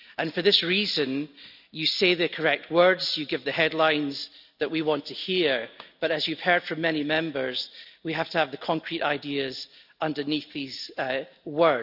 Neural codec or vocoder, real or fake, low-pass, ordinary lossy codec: none; real; 5.4 kHz; none